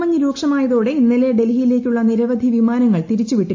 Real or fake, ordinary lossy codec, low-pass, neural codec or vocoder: real; AAC, 48 kbps; 7.2 kHz; none